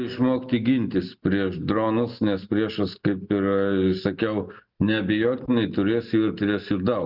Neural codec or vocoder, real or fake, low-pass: none; real; 5.4 kHz